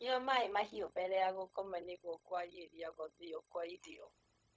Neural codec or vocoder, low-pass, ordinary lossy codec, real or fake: codec, 16 kHz, 0.4 kbps, LongCat-Audio-Codec; none; none; fake